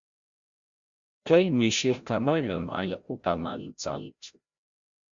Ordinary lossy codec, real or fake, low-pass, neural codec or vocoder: Opus, 64 kbps; fake; 7.2 kHz; codec, 16 kHz, 0.5 kbps, FreqCodec, larger model